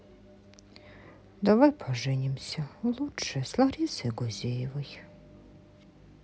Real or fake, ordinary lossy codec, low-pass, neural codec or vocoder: real; none; none; none